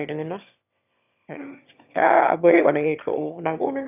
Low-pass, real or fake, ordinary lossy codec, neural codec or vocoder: 3.6 kHz; fake; none; autoencoder, 22.05 kHz, a latent of 192 numbers a frame, VITS, trained on one speaker